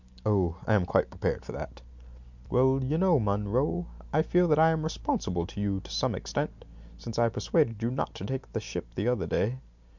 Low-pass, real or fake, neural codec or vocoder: 7.2 kHz; real; none